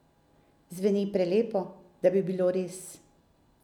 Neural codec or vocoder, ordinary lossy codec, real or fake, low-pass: none; none; real; 19.8 kHz